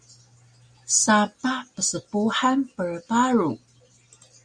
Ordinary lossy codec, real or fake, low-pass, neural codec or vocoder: Opus, 64 kbps; real; 9.9 kHz; none